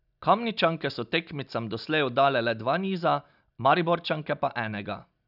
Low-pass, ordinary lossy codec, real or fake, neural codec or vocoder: 5.4 kHz; none; real; none